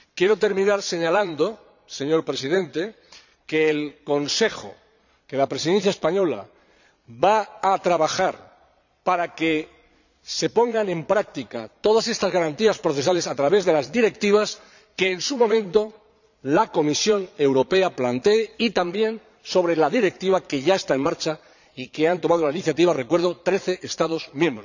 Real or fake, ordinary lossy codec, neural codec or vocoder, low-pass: fake; none; vocoder, 22.05 kHz, 80 mel bands, Vocos; 7.2 kHz